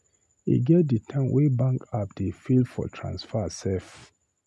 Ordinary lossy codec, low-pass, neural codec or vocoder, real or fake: none; none; none; real